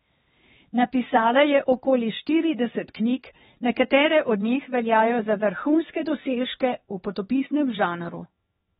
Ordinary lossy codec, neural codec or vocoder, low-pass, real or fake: AAC, 16 kbps; codec, 16 kHz, 2 kbps, X-Codec, HuBERT features, trained on LibriSpeech; 7.2 kHz; fake